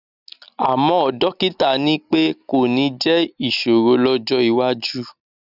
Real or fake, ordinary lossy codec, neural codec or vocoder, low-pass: real; none; none; 5.4 kHz